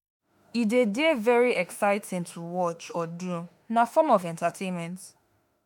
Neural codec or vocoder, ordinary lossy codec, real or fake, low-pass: autoencoder, 48 kHz, 32 numbers a frame, DAC-VAE, trained on Japanese speech; MP3, 96 kbps; fake; 19.8 kHz